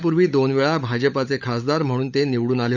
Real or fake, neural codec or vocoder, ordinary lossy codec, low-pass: fake; codec, 16 kHz, 16 kbps, FunCodec, trained on LibriTTS, 50 frames a second; none; 7.2 kHz